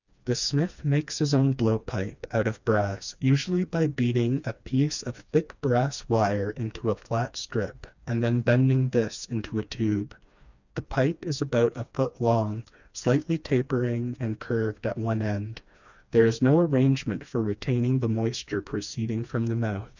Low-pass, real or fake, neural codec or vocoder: 7.2 kHz; fake; codec, 16 kHz, 2 kbps, FreqCodec, smaller model